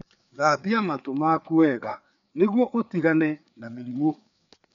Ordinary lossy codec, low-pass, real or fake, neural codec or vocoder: none; 7.2 kHz; fake; codec, 16 kHz, 4 kbps, FreqCodec, larger model